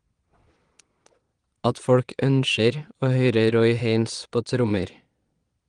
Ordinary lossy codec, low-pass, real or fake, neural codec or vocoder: Opus, 24 kbps; 9.9 kHz; fake; vocoder, 22.05 kHz, 80 mel bands, Vocos